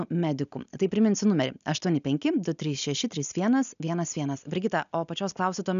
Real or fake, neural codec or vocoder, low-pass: real; none; 7.2 kHz